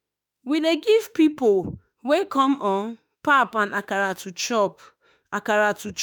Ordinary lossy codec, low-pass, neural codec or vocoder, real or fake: none; none; autoencoder, 48 kHz, 32 numbers a frame, DAC-VAE, trained on Japanese speech; fake